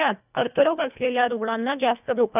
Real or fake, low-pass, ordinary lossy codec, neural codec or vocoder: fake; 3.6 kHz; none; codec, 24 kHz, 1.5 kbps, HILCodec